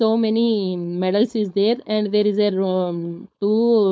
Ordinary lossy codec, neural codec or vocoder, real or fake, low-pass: none; codec, 16 kHz, 4.8 kbps, FACodec; fake; none